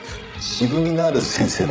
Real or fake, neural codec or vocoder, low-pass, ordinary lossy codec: fake; codec, 16 kHz, 16 kbps, FreqCodec, larger model; none; none